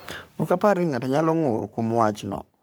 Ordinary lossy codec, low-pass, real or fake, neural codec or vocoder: none; none; fake; codec, 44.1 kHz, 3.4 kbps, Pupu-Codec